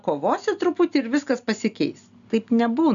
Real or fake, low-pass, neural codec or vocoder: real; 7.2 kHz; none